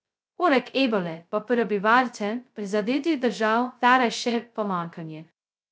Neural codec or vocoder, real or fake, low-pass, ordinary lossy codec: codec, 16 kHz, 0.2 kbps, FocalCodec; fake; none; none